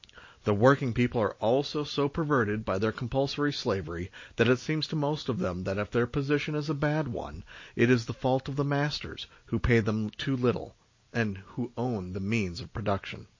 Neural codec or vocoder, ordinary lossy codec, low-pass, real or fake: none; MP3, 32 kbps; 7.2 kHz; real